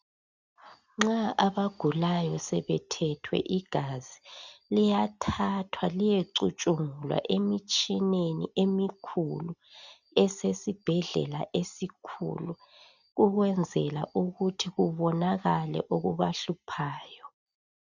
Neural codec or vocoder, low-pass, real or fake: none; 7.2 kHz; real